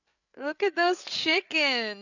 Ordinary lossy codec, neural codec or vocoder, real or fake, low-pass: none; codec, 16 kHz, 8 kbps, FreqCodec, larger model; fake; 7.2 kHz